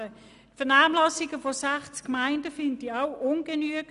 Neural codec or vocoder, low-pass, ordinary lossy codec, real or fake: none; 10.8 kHz; none; real